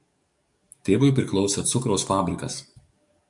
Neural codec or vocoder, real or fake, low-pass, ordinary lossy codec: codec, 44.1 kHz, 7.8 kbps, DAC; fake; 10.8 kHz; MP3, 64 kbps